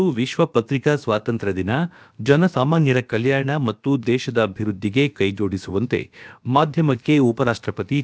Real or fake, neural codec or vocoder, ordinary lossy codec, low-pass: fake; codec, 16 kHz, about 1 kbps, DyCAST, with the encoder's durations; none; none